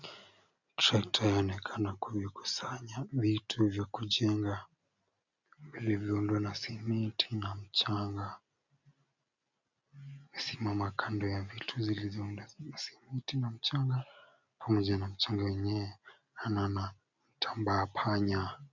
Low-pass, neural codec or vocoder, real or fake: 7.2 kHz; none; real